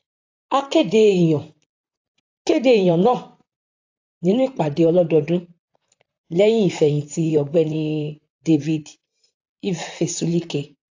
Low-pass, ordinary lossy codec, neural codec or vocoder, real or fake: 7.2 kHz; AAC, 48 kbps; vocoder, 44.1 kHz, 128 mel bands, Pupu-Vocoder; fake